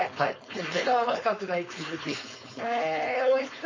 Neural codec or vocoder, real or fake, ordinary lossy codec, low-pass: codec, 16 kHz, 4.8 kbps, FACodec; fake; MP3, 32 kbps; 7.2 kHz